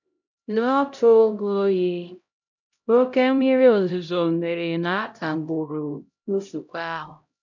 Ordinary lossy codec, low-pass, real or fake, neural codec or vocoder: none; 7.2 kHz; fake; codec, 16 kHz, 0.5 kbps, X-Codec, HuBERT features, trained on LibriSpeech